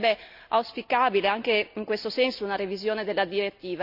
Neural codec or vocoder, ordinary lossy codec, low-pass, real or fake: none; none; 5.4 kHz; real